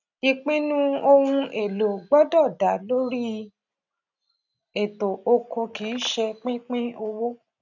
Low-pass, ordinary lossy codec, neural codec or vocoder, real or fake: 7.2 kHz; none; none; real